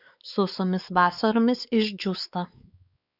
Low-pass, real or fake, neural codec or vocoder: 5.4 kHz; fake; codec, 16 kHz, 4 kbps, X-Codec, WavLM features, trained on Multilingual LibriSpeech